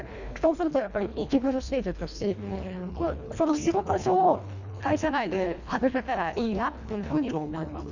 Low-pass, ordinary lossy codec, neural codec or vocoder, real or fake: 7.2 kHz; none; codec, 24 kHz, 1.5 kbps, HILCodec; fake